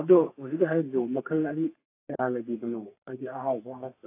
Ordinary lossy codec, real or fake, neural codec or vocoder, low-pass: AAC, 24 kbps; fake; codec, 32 kHz, 1.9 kbps, SNAC; 3.6 kHz